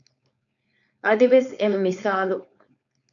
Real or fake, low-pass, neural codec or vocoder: fake; 7.2 kHz; codec, 16 kHz, 4.8 kbps, FACodec